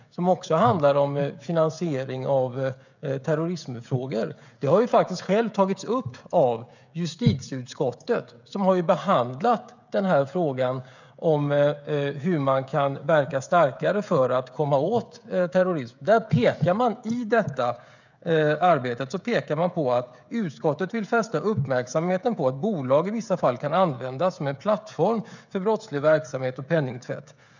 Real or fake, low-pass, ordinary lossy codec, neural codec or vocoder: fake; 7.2 kHz; none; codec, 16 kHz, 16 kbps, FreqCodec, smaller model